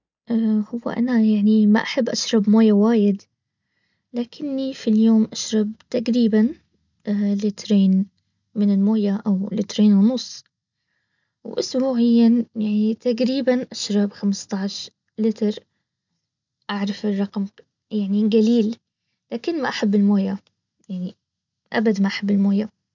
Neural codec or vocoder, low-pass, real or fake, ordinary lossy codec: none; 7.2 kHz; real; none